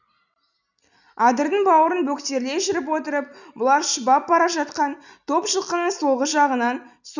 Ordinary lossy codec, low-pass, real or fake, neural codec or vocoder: none; 7.2 kHz; real; none